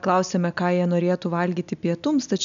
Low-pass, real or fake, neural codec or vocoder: 7.2 kHz; real; none